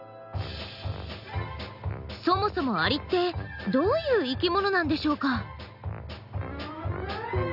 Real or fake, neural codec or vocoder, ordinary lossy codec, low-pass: real; none; none; 5.4 kHz